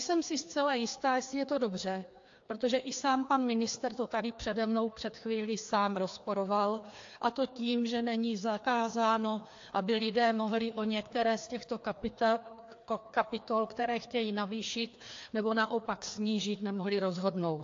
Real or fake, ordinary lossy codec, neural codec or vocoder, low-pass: fake; AAC, 48 kbps; codec, 16 kHz, 2 kbps, FreqCodec, larger model; 7.2 kHz